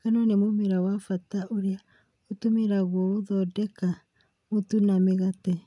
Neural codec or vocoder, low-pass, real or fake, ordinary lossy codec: none; 10.8 kHz; real; none